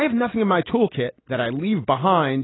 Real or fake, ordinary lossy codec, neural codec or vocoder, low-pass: real; AAC, 16 kbps; none; 7.2 kHz